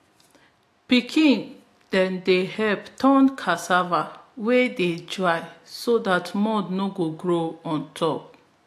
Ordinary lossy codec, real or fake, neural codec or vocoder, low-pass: AAC, 64 kbps; real; none; 14.4 kHz